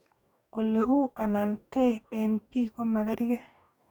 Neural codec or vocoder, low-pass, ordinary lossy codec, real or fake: codec, 44.1 kHz, 2.6 kbps, DAC; 19.8 kHz; none; fake